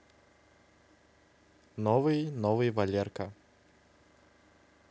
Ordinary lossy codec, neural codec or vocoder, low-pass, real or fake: none; none; none; real